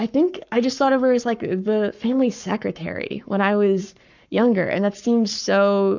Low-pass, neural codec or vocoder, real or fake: 7.2 kHz; codec, 44.1 kHz, 7.8 kbps, Pupu-Codec; fake